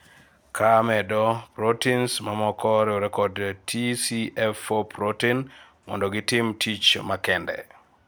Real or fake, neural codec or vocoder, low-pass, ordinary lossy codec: real; none; none; none